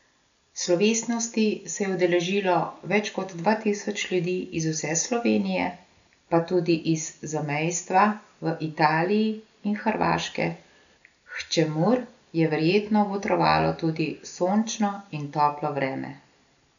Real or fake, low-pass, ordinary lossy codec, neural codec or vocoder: real; 7.2 kHz; none; none